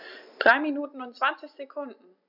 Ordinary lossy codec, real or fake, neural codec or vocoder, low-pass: none; real; none; 5.4 kHz